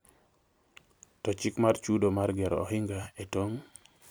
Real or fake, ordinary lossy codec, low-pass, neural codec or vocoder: fake; none; none; vocoder, 44.1 kHz, 128 mel bands every 256 samples, BigVGAN v2